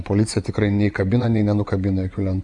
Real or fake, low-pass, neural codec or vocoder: fake; 10.8 kHz; vocoder, 24 kHz, 100 mel bands, Vocos